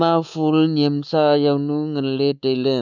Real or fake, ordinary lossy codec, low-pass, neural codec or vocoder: fake; none; 7.2 kHz; codec, 24 kHz, 3.1 kbps, DualCodec